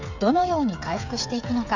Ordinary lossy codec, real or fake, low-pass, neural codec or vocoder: none; fake; 7.2 kHz; codec, 16 kHz, 8 kbps, FreqCodec, smaller model